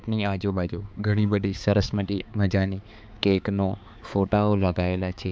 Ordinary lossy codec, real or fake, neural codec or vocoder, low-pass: none; fake; codec, 16 kHz, 4 kbps, X-Codec, HuBERT features, trained on balanced general audio; none